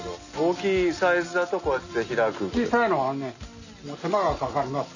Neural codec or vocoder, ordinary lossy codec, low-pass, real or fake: none; none; 7.2 kHz; real